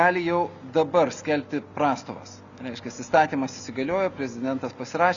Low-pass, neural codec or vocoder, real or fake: 7.2 kHz; none; real